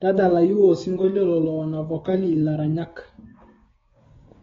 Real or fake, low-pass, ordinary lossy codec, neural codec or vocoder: fake; 7.2 kHz; AAC, 24 kbps; codec, 16 kHz, 6 kbps, DAC